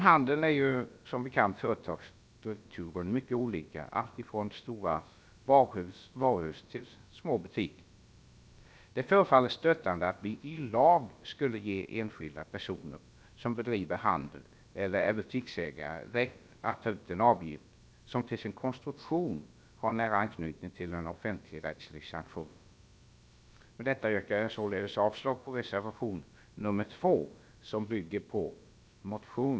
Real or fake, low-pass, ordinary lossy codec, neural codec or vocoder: fake; none; none; codec, 16 kHz, about 1 kbps, DyCAST, with the encoder's durations